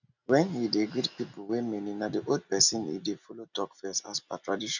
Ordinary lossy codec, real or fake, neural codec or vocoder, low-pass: none; real; none; 7.2 kHz